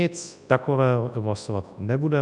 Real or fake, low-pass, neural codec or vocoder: fake; 10.8 kHz; codec, 24 kHz, 0.9 kbps, WavTokenizer, large speech release